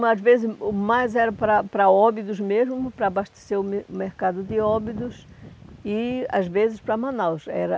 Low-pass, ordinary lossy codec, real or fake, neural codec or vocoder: none; none; real; none